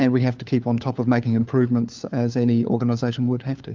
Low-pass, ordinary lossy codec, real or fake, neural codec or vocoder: 7.2 kHz; Opus, 24 kbps; fake; codec, 16 kHz, 4 kbps, FunCodec, trained on LibriTTS, 50 frames a second